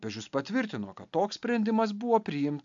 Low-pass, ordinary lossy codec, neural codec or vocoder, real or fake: 7.2 kHz; AAC, 64 kbps; none; real